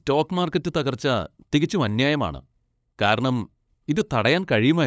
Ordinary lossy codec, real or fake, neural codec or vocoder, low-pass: none; fake; codec, 16 kHz, 8 kbps, FunCodec, trained on LibriTTS, 25 frames a second; none